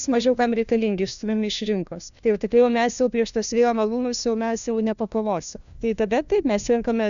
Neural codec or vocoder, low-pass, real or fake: codec, 16 kHz, 1 kbps, FunCodec, trained on LibriTTS, 50 frames a second; 7.2 kHz; fake